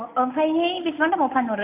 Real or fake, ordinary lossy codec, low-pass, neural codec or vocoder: real; none; 3.6 kHz; none